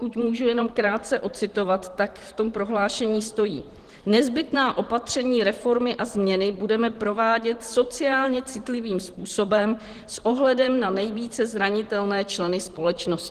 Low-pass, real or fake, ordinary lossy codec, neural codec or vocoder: 14.4 kHz; fake; Opus, 16 kbps; vocoder, 44.1 kHz, 128 mel bands, Pupu-Vocoder